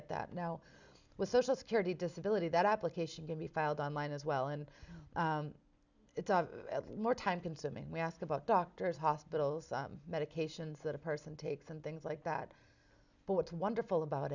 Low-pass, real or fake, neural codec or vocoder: 7.2 kHz; real; none